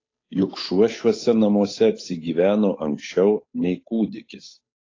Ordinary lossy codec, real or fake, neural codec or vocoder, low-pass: AAC, 32 kbps; fake; codec, 16 kHz, 8 kbps, FunCodec, trained on Chinese and English, 25 frames a second; 7.2 kHz